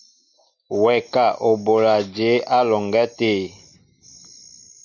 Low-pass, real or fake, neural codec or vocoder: 7.2 kHz; real; none